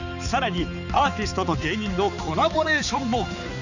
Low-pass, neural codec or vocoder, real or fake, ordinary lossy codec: 7.2 kHz; codec, 16 kHz, 4 kbps, X-Codec, HuBERT features, trained on balanced general audio; fake; none